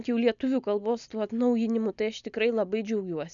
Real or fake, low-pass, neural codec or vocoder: real; 7.2 kHz; none